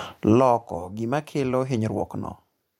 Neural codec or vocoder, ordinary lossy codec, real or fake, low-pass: vocoder, 44.1 kHz, 128 mel bands every 512 samples, BigVGAN v2; MP3, 64 kbps; fake; 19.8 kHz